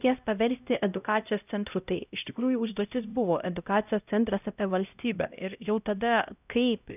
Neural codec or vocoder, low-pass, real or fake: codec, 16 kHz, 0.5 kbps, X-Codec, HuBERT features, trained on LibriSpeech; 3.6 kHz; fake